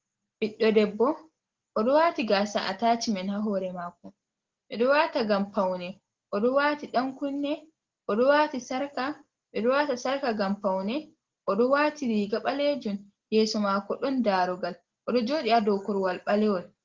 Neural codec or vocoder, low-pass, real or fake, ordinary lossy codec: none; 7.2 kHz; real; Opus, 16 kbps